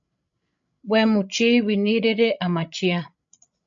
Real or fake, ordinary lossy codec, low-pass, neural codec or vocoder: fake; MP3, 48 kbps; 7.2 kHz; codec, 16 kHz, 16 kbps, FreqCodec, larger model